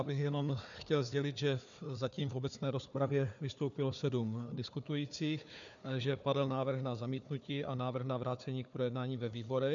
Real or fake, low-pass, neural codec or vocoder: fake; 7.2 kHz; codec, 16 kHz, 4 kbps, FunCodec, trained on Chinese and English, 50 frames a second